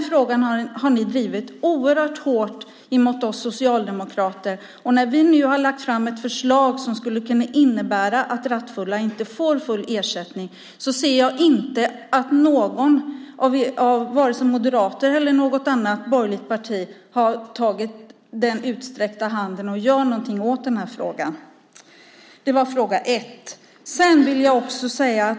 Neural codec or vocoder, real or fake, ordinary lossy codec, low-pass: none; real; none; none